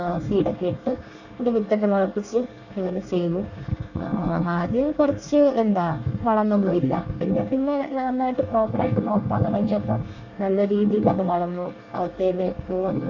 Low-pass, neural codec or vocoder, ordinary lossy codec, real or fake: 7.2 kHz; codec, 24 kHz, 1 kbps, SNAC; none; fake